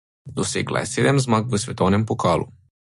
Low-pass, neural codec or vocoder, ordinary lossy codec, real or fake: 14.4 kHz; none; MP3, 48 kbps; real